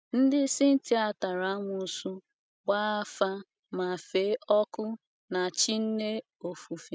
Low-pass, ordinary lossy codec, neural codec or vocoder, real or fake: none; none; none; real